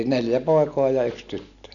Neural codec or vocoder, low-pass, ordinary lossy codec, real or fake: none; 7.2 kHz; none; real